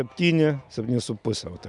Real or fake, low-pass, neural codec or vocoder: real; 10.8 kHz; none